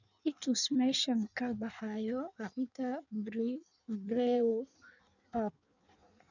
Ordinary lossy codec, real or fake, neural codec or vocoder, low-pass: none; fake; codec, 16 kHz in and 24 kHz out, 1.1 kbps, FireRedTTS-2 codec; 7.2 kHz